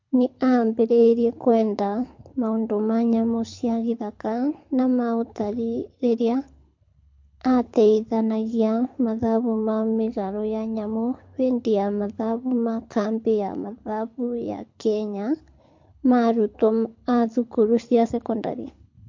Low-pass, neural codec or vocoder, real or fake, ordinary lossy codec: 7.2 kHz; codec, 24 kHz, 6 kbps, HILCodec; fake; MP3, 48 kbps